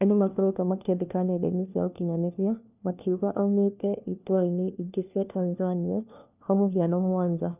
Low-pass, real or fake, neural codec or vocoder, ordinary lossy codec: 3.6 kHz; fake; codec, 16 kHz, 1 kbps, FunCodec, trained on LibriTTS, 50 frames a second; none